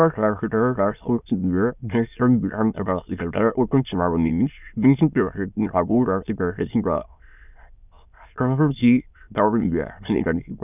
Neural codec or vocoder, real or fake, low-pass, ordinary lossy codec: autoencoder, 22.05 kHz, a latent of 192 numbers a frame, VITS, trained on many speakers; fake; 3.6 kHz; none